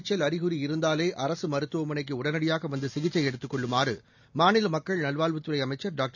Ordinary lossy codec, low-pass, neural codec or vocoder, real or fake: none; 7.2 kHz; none; real